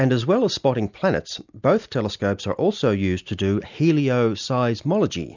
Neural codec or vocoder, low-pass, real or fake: none; 7.2 kHz; real